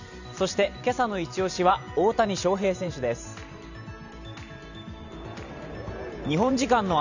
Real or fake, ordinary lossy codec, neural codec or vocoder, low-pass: fake; none; vocoder, 44.1 kHz, 128 mel bands every 512 samples, BigVGAN v2; 7.2 kHz